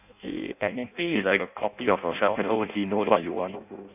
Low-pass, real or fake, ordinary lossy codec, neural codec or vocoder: 3.6 kHz; fake; none; codec, 16 kHz in and 24 kHz out, 0.6 kbps, FireRedTTS-2 codec